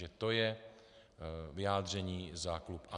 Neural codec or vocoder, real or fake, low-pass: none; real; 10.8 kHz